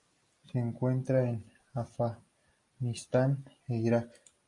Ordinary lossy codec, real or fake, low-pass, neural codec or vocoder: MP3, 48 kbps; real; 10.8 kHz; none